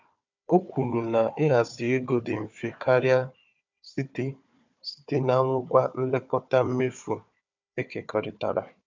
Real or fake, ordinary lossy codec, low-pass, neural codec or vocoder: fake; MP3, 64 kbps; 7.2 kHz; codec, 16 kHz, 4 kbps, FunCodec, trained on Chinese and English, 50 frames a second